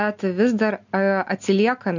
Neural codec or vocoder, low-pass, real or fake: none; 7.2 kHz; real